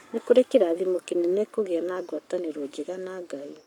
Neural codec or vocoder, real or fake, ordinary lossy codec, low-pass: codec, 44.1 kHz, 7.8 kbps, Pupu-Codec; fake; none; 19.8 kHz